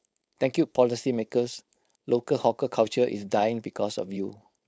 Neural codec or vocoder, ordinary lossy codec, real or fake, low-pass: codec, 16 kHz, 4.8 kbps, FACodec; none; fake; none